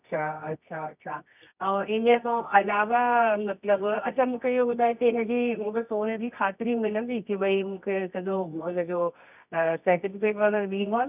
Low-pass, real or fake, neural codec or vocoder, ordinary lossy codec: 3.6 kHz; fake; codec, 24 kHz, 0.9 kbps, WavTokenizer, medium music audio release; none